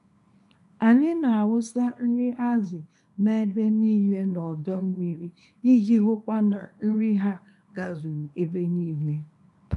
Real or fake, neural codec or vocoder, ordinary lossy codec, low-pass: fake; codec, 24 kHz, 0.9 kbps, WavTokenizer, small release; none; 10.8 kHz